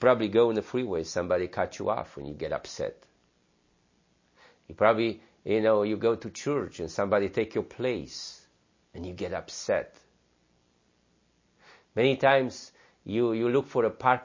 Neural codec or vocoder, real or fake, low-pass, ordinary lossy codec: none; real; 7.2 kHz; MP3, 32 kbps